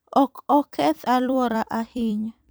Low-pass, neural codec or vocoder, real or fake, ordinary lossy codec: none; vocoder, 44.1 kHz, 128 mel bands every 256 samples, BigVGAN v2; fake; none